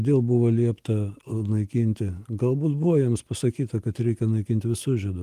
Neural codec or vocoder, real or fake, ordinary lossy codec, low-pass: none; real; Opus, 24 kbps; 14.4 kHz